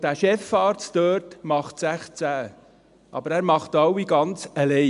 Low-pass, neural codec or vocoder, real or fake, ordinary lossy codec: 10.8 kHz; none; real; AAC, 96 kbps